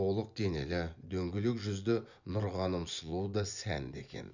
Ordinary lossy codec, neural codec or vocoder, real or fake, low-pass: none; vocoder, 24 kHz, 100 mel bands, Vocos; fake; 7.2 kHz